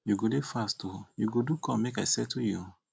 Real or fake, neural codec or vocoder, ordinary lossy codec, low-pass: real; none; none; none